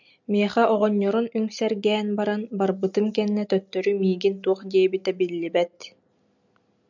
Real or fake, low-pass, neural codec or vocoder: real; 7.2 kHz; none